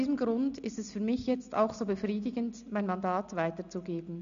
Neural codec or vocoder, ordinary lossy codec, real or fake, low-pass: none; none; real; 7.2 kHz